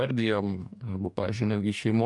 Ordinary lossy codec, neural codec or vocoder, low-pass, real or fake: MP3, 96 kbps; codec, 44.1 kHz, 2.6 kbps, DAC; 10.8 kHz; fake